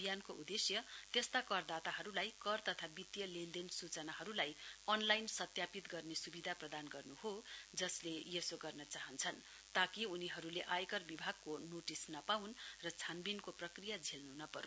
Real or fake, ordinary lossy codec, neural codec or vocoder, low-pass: real; none; none; none